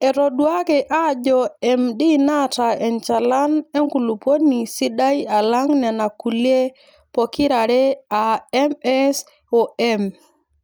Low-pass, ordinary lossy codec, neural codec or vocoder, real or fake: none; none; none; real